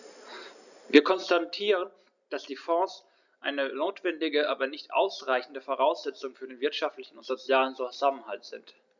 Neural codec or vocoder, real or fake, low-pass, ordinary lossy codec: none; real; 7.2 kHz; none